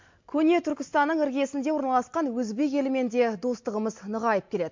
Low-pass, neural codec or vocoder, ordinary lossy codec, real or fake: 7.2 kHz; none; MP3, 48 kbps; real